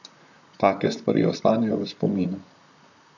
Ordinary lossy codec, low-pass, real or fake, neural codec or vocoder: none; 7.2 kHz; fake; vocoder, 44.1 kHz, 128 mel bands, Pupu-Vocoder